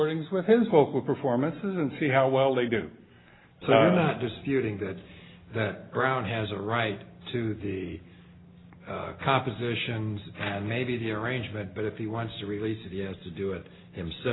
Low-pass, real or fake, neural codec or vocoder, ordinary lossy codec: 7.2 kHz; real; none; AAC, 16 kbps